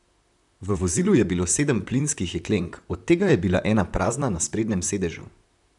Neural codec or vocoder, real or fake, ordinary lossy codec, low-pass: vocoder, 44.1 kHz, 128 mel bands, Pupu-Vocoder; fake; none; 10.8 kHz